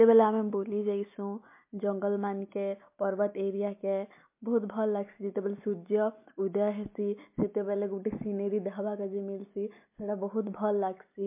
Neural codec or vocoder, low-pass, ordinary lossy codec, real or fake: none; 3.6 kHz; MP3, 24 kbps; real